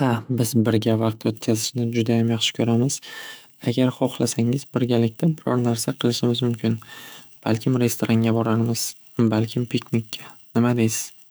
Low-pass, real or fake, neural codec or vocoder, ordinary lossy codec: none; fake; autoencoder, 48 kHz, 128 numbers a frame, DAC-VAE, trained on Japanese speech; none